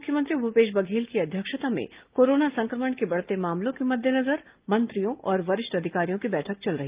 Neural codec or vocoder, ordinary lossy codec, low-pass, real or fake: none; Opus, 32 kbps; 3.6 kHz; real